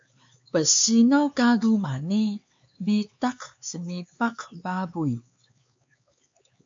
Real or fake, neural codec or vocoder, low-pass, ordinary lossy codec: fake; codec, 16 kHz, 4 kbps, X-Codec, HuBERT features, trained on LibriSpeech; 7.2 kHz; MP3, 48 kbps